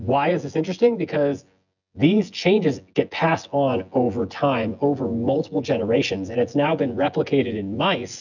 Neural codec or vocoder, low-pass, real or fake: vocoder, 24 kHz, 100 mel bands, Vocos; 7.2 kHz; fake